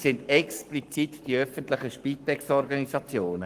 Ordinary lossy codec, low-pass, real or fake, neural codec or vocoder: Opus, 24 kbps; 14.4 kHz; fake; codec, 44.1 kHz, 7.8 kbps, Pupu-Codec